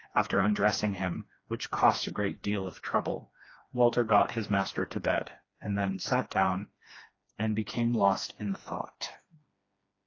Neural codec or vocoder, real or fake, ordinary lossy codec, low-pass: codec, 16 kHz, 2 kbps, FreqCodec, smaller model; fake; AAC, 32 kbps; 7.2 kHz